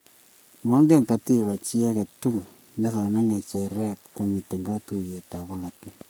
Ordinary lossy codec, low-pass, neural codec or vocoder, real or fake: none; none; codec, 44.1 kHz, 3.4 kbps, Pupu-Codec; fake